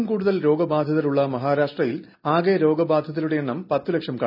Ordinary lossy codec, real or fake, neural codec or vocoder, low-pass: none; real; none; 5.4 kHz